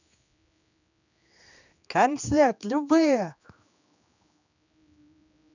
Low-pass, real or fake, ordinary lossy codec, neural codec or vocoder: 7.2 kHz; fake; none; codec, 16 kHz, 2 kbps, X-Codec, HuBERT features, trained on general audio